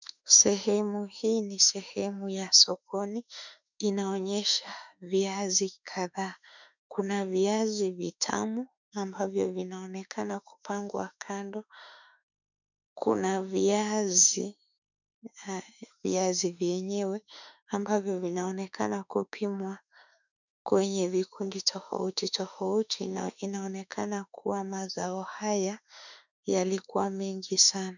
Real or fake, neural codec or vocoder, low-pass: fake; autoencoder, 48 kHz, 32 numbers a frame, DAC-VAE, trained on Japanese speech; 7.2 kHz